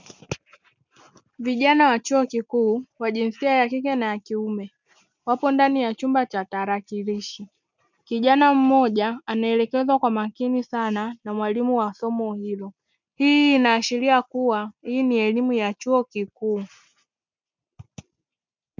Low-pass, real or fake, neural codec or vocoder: 7.2 kHz; real; none